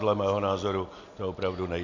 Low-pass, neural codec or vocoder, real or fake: 7.2 kHz; none; real